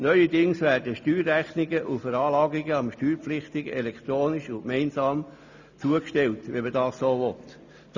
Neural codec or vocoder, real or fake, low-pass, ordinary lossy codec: none; real; 7.2 kHz; none